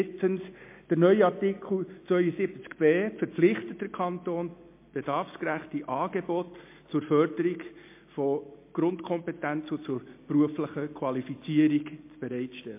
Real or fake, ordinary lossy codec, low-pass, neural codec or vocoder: real; MP3, 24 kbps; 3.6 kHz; none